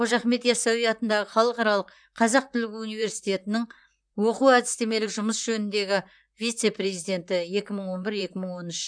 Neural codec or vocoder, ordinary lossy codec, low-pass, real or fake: vocoder, 44.1 kHz, 128 mel bands, Pupu-Vocoder; none; 9.9 kHz; fake